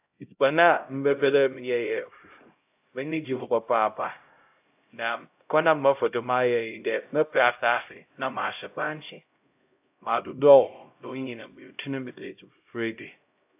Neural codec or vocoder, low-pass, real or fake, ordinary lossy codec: codec, 16 kHz, 0.5 kbps, X-Codec, HuBERT features, trained on LibriSpeech; 3.6 kHz; fake; AAC, 32 kbps